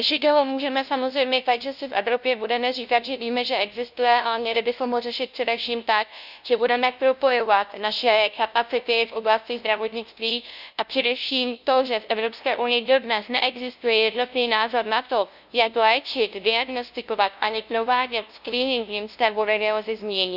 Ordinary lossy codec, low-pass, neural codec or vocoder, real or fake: none; 5.4 kHz; codec, 16 kHz, 0.5 kbps, FunCodec, trained on LibriTTS, 25 frames a second; fake